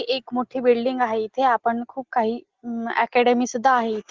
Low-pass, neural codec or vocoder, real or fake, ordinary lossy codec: 7.2 kHz; none; real; Opus, 16 kbps